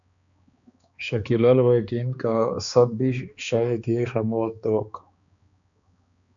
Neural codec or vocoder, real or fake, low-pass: codec, 16 kHz, 2 kbps, X-Codec, HuBERT features, trained on balanced general audio; fake; 7.2 kHz